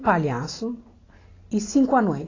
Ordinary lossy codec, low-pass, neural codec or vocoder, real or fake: AAC, 32 kbps; 7.2 kHz; none; real